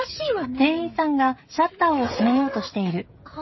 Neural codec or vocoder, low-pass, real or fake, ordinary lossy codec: codec, 16 kHz, 16 kbps, FreqCodec, smaller model; 7.2 kHz; fake; MP3, 24 kbps